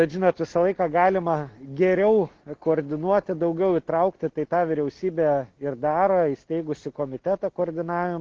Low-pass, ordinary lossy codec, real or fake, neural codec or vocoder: 7.2 kHz; Opus, 16 kbps; real; none